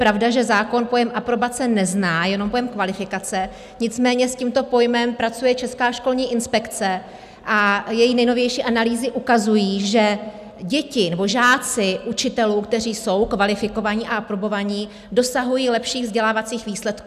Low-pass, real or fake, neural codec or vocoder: 14.4 kHz; real; none